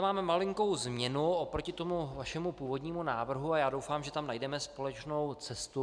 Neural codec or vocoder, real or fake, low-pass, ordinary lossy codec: none; real; 9.9 kHz; MP3, 96 kbps